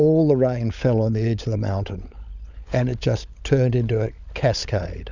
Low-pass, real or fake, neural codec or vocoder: 7.2 kHz; fake; codec, 16 kHz, 16 kbps, FunCodec, trained on LibriTTS, 50 frames a second